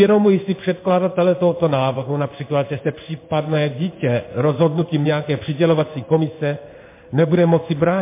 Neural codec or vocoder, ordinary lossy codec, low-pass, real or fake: codec, 16 kHz in and 24 kHz out, 1 kbps, XY-Tokenizer; MP3, 24 kbps; 3.6 kHz; fake